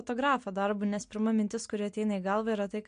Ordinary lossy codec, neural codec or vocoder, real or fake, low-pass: MP3, 64 kbps; none; real; 9.9 kHz